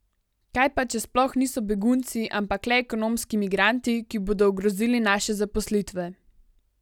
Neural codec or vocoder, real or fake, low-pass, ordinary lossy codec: none; real; 19.8 kHz; none